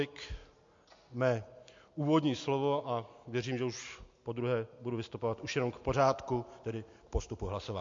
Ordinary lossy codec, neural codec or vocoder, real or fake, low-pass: MP3, 48 kbps; none; real; 7.2 kHz